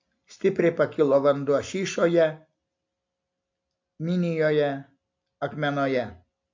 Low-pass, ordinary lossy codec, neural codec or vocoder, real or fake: 7.2 kHz; MP3, 48 kbps; none; real